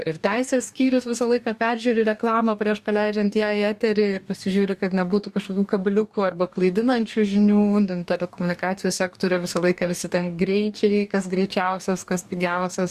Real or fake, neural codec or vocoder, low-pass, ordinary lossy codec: fake; codec, 44.1 kHz, 2.6 kbps, DAC; 14.4 kHz; Opus, 64 kbps